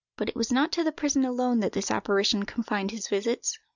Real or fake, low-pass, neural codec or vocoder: real; 7.2 kHz; none